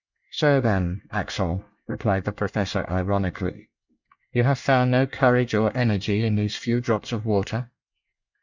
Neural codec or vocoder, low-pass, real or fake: codec, 24 kHz, 1 kbps, SNAC; 7.2 kHz; fake